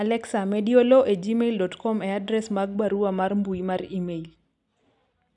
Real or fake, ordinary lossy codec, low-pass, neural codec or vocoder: real; none; 10.8 kHz; none